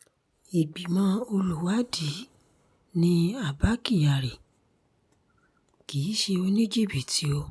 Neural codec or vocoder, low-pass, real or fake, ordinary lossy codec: none; none; real; none